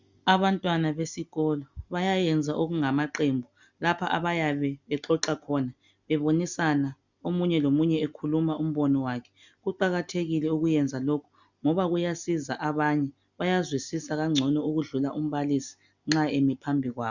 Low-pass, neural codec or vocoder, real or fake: 7.2 kHz; none; real